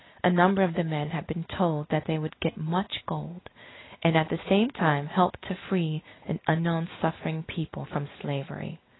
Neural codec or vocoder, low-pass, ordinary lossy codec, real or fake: none; 7.2 kHz; AAC, 16 kbps; real